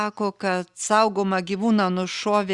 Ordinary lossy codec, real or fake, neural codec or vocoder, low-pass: Opus, 64 kbps; real; none; 10.8 kHz